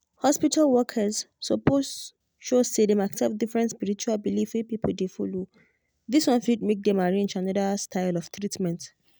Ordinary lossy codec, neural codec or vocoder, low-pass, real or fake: none; none; none; real